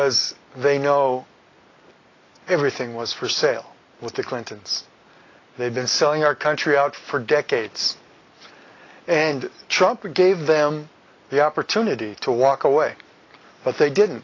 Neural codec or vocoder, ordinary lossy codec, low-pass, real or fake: none; AAC, 32 kbps; 7.2 kHz; real